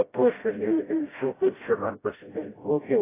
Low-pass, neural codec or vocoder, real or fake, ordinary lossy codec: 3.6 kHz; codec, 16 kHz, 0.5 kbps, FreqCodec, smaller model; fake; AAC, 16 kbps